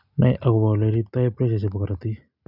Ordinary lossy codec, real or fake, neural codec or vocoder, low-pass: none; real; none; 5.4 kHz